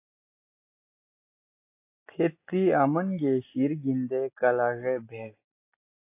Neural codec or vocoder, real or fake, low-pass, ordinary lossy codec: none; real; 3.6 kHz; AAC, 24 kbps